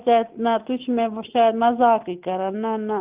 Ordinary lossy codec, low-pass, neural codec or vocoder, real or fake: Opus, 24 kbps; 3.6 kHz; none; real